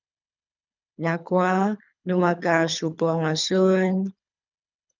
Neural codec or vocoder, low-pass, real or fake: codec, 24 kHz, 3 kbps, HILCodec; 7.2 kHz; fake